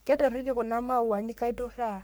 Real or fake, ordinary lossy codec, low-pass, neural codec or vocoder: fake; none; none; codec, 44.1 kHz, 3.4 kbps, Pupu-Codec